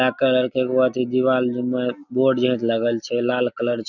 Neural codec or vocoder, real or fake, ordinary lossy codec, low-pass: none; real; none; 7.2 kHz